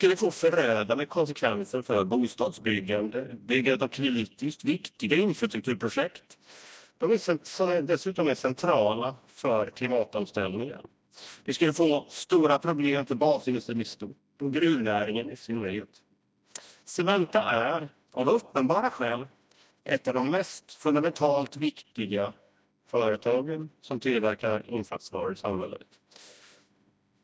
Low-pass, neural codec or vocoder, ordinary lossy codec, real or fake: none; codec, 16 kHz, 1 kbps, FreqCodec, smaller model; none; fake